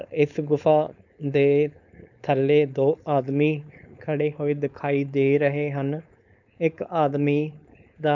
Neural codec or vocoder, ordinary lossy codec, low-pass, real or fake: codec, 16 kHz, 4.8 kbps, FACodec; none; 7.2 kHz; fake